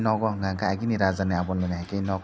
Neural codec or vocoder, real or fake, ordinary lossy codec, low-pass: none; real; none; none